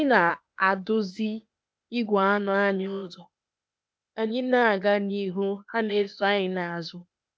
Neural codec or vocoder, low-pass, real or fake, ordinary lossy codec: codec, 16 kHz, 0.8 kbps, ZipCodec; none; fake; none